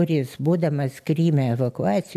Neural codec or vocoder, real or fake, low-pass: none; real; 14.4 kHz